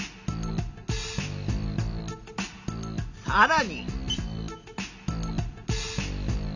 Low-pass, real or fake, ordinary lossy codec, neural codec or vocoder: 7.2 kHz; real; none; none